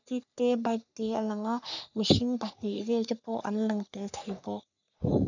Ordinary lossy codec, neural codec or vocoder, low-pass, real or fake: none; codec, 44.1 kHz, 3.4 kbps, Pupu-Codec; 7.2 kHz; fake